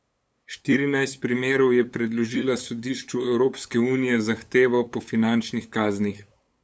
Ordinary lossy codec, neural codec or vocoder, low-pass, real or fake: none; codec, 16 kHz, 8 kbps, FunCodec, trained on LibriTTS, 25 frames a second; none; fake